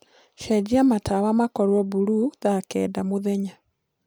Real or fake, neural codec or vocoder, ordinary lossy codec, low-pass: real; none; none; none